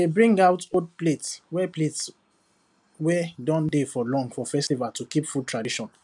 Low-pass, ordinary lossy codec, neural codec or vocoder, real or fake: 10.8 kHz; none; none; real